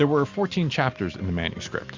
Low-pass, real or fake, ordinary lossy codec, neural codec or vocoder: 7.2 kHz; real; MP3, 64 kbps; none